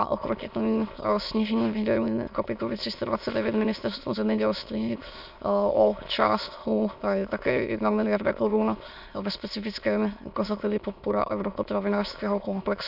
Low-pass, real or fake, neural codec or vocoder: 5.4 kHz; fake; autoencoder, 22.05 kHz, a latent of 192 numbers a frame, VITS, trained on many speakers